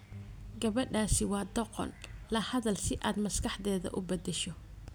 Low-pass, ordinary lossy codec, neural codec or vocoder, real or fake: none; none; none; real